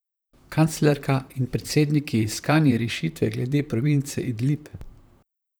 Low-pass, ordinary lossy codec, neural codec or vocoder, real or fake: none; none; vocoder, 44.1 kHz, 128 mel bands, Pupu-Vocoder; fake